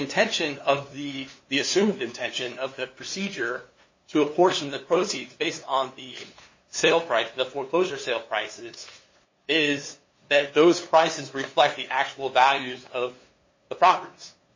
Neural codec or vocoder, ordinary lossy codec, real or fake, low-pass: codec, 16 kHz, 2 kbps, FunCodec, trained on LibriTTS, 25 frames a second; MP3, 32 kbps; fake; 7.2 kHz